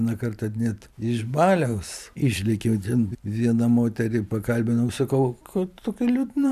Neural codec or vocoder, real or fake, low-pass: vocoder, 44.1 kHz, 128 mel bands every 512 samples, BigVGAN v2; fake; 14.4 kHz